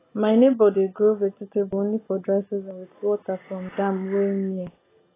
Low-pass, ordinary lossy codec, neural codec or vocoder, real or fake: 3.6 kHz; AAC, 16 kbps; none; real